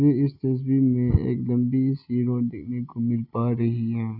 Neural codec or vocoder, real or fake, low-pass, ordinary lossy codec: none; real; 5.4 kHz; none